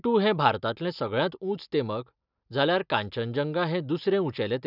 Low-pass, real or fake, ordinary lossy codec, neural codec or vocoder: 5.4 kHz; real; none; none